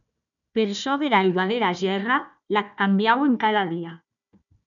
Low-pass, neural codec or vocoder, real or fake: 7.2 kHz; codec, 16 kHz, 1 kbps, FunCodec, trained on Chinese and English, 50 frames a second; fake